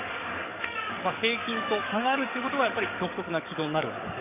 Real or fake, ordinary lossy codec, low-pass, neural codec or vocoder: fake; none; 3.6 kHz; codec, 44.1 kHz, 7.8 kbps, DAC